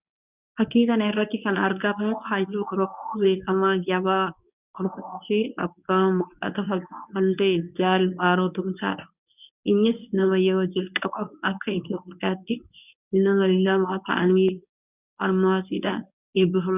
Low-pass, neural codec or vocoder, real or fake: 3.6 kHz; codec, 24 kHz, 0.9 kbps, WavTokenizer, medium speech release version 1; fake